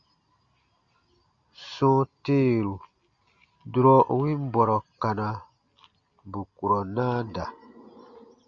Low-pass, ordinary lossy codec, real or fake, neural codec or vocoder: 7.2 kHz; Opus, 64 kbps; real; none